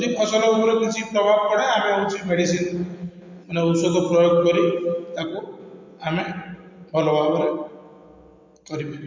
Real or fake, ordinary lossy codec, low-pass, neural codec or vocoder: real; MP3, 48 kbps; 7.2 kHz; none